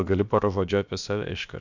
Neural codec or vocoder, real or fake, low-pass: codec, 16 kHz, about 1 kbps, DyCAST, with the encoder's durations; fake; 7.2 kHz